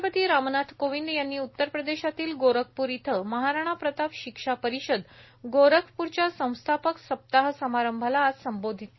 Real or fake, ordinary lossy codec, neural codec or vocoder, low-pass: real; MP3, 24 kbps; none; 7.2 kHz